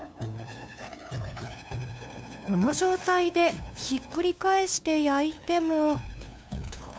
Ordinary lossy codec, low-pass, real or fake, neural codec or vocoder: none; none; fake; codec, 16 kHz, 2 kbps, FunCodec, trained on LibriTTS, 25 frames a second